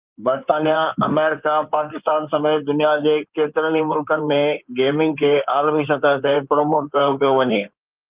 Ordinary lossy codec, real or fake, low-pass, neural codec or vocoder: Opus, 32 kbps; fake; 3.6 kHz; codec, 16 kHz in and 24 kHz out, 2.2 kbps, FireRedTTS-2 codec